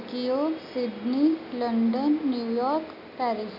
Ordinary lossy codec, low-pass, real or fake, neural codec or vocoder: none; 5.4 kHz; real; none